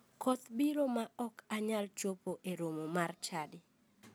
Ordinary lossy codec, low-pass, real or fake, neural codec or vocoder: none; none; real; none